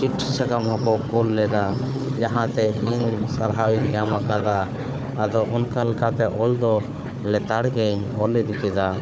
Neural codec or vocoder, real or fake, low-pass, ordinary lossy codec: codec, 16 kHz, 4 kbps, FunCodec, trained on Chinese and English, 50 frames a second; fake; none; none